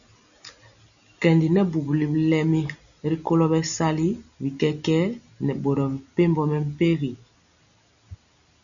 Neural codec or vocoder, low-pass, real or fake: none; 7.2 kHz; real